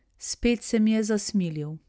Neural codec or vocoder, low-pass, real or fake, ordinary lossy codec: none; none; real; none